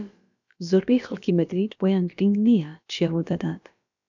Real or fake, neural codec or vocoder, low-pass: fake; codec, 16 kHz, about 1 kbps, DyCAST, with the encoder's durations; 7.2 kHz